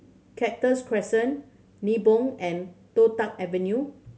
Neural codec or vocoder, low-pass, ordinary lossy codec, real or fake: none; none; none; real